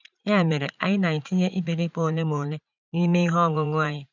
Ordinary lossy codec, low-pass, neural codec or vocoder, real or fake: none; 7.2 kHz; vocoder, 44.1 kHz, 80 mel bands, Vocos; fake